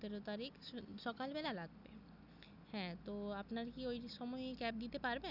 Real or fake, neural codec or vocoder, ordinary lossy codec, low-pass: real; none; none; 5.4 kHz